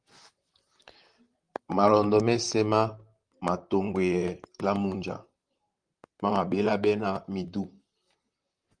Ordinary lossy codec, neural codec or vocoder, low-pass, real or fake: Opus, 32 kbps; vocoder, 44.1 kHz, 128 mel bands, Pupu-Vocoder; 9.9 kHz; fake